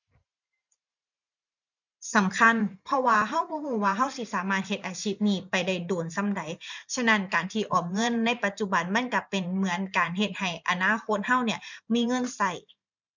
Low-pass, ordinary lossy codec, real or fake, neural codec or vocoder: 7.2 kHz; none; fake; vocoder, 44.1 kHz, 128 mel bands every 512 samples, BigVGAN v2